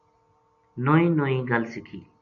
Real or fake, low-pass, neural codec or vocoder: real; 7.2 kHz; none